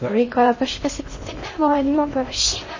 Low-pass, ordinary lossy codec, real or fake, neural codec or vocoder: 7.2 kHz; MP3, 32 kbps; fake; codec, 16 kHz in and 24 kHz out, 0.6 kbps, FocalCodec, streaming, 2048 codes